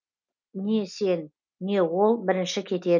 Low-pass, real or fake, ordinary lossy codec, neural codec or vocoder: 7.2 kHz; real; none; none